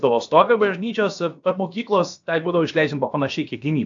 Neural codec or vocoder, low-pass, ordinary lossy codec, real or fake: codec, 16 kHz, about 1 kbps, DyCAST, with the encoder's durations; 7.2 kHz; AAC, 64 kbps; fake